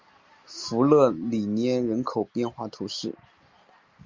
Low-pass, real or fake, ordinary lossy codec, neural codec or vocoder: 7.2 kHz; real; Opus, 32 kbps; none